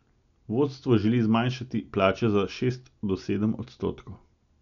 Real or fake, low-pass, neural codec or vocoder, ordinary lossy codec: real; 7.2 kHz; none; none